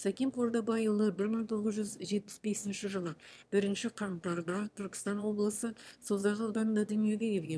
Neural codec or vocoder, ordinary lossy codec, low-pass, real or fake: autoencoder, 22.05 kHz, a latent of 192 numbers a frame, VITS, trained on one speaker; none; none; fake